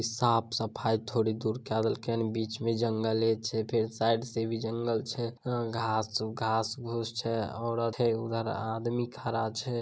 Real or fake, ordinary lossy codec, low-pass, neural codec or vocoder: real; none; none; none